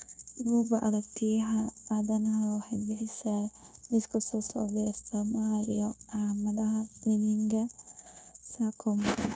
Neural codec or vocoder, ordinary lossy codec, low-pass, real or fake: codec, 16 kHz, 0.9 kbps, LongCat-Audio-Codec; none; none; fake